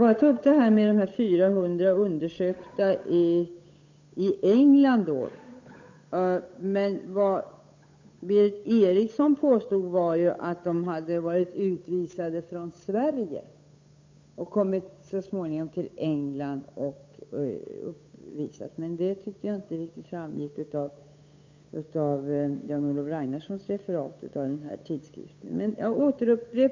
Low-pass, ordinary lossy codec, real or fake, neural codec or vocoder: 7.2 kHz; MP3, 48 kbps; fake; codec, 16 kHz, 8 kbps, FunCodec, trained on Chinese and English, 25 frames a second